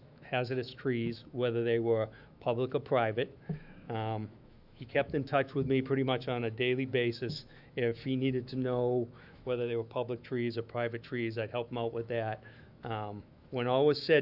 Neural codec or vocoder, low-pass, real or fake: autoencoder, 48 kHz, 128 numbers a frame, DAC-VAE, trained on Japanese speech; 5.4 kHz; fake